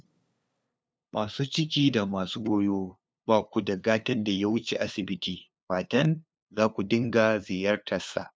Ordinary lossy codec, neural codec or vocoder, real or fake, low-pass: none; codec, 16 kHz, 2 kbps, FunCodec, trained on LibriTTS, 25 frames a second; fake; none